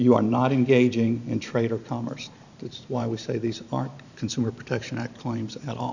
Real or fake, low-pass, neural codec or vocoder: fake; 7.2 kHz; vocoder, 44.1 kHz, 128 mel bands every 512 samples, BigVGAN v2